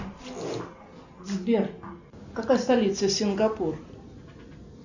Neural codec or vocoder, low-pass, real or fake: none; 7.2 kHz; real